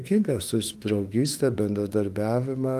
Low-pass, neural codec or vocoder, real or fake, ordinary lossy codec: 14.4 kHz; autoencoder, 48 kHz, 32 numbers a frame, DAC-VAE, trained on Japanese speech; fake; Opus, 24 kbps